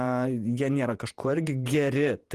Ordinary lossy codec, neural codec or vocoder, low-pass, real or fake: Opus, 24 kbps; vocoder, 48 kHz, 128 mel bands, Vocos; 14.4 kHz; fake